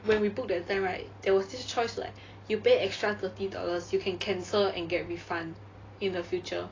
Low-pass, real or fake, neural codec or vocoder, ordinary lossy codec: 7.2 kHz; real; none; AAC, 32 kbps